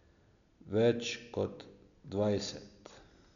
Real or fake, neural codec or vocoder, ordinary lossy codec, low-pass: real; none; none; 7.2 kHz